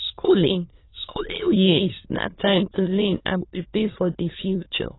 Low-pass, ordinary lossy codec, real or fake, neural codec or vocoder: 7.2 kHz; AAC, 16 kbps; fake; autoencoder, 22.05 kHz, a latent of 192 numbers a frame, VITS, trained on many speakers